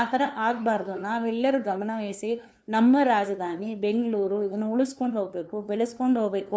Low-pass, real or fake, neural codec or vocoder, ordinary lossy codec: none; fake; codec, 16 kHz, 2 kbps, FunCodec, trained on LibriTTS, 25 frames a second; none